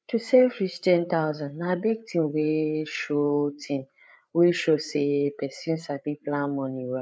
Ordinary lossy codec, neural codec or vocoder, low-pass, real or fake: none; codec, 16 kHz, 8 kbps, FreqCodec, larger model; none; fake